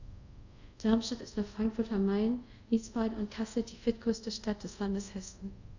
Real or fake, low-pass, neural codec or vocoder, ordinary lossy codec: fake; 7.2 kHz; codec, 24 kHz, 0.5 kbps, DualCodec; none